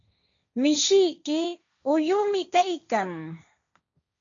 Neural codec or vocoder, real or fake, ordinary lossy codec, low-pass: codec, 16 kHz, 1.1 kbps, Voila-Tokenizer; fake; AAC, 64 kbps; 7.2 kHz